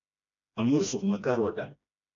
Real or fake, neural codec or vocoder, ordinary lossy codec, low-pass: fake; codec, 16 kHz, 1 kbps, FreqCodec, smaller model; MP3, 96 kbps; 7.2 kHz